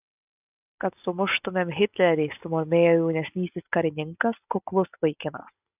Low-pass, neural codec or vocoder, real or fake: 3.6 kHz; none; real